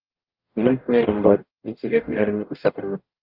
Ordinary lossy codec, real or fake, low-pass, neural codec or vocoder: Opus, 24 kbps; fake; 5.4 kHz; codec, 44.1 kHz, 0.9 kbps, DAC